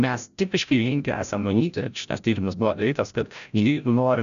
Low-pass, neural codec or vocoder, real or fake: 7.2 kHz; codec, 16 kHz, 0.5 kbps, FreqCodec, larger model; fake